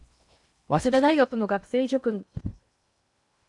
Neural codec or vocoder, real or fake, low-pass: codec, 16 kHz in and 24 kHz out, 0.8 kbps, FocalCodec, streaming, 65536 codes; fake; 10.8 kHz